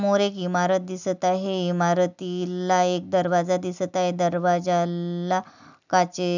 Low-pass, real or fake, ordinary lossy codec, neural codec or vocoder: 7.2 kHz; real; none; none